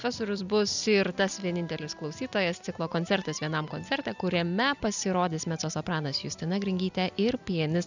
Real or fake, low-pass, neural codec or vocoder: real; 7.2 kHz; none